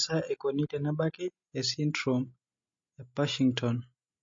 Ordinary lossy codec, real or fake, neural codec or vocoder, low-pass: MP3, 32 kbps; real; none; 7.2 kHz